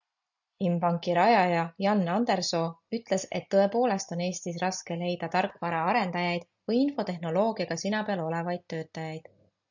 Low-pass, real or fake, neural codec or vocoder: 7.2 kHz; real; none